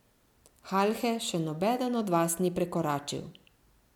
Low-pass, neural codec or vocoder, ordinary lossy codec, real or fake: 19.8 kHz; none; none; real